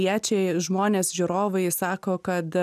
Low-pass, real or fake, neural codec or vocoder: 14.4 kHz; real; none